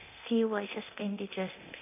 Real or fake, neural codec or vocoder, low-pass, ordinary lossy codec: fake; codec, 24 kHz, 0.9 kbps, DualCodec; 3.6 kHz; none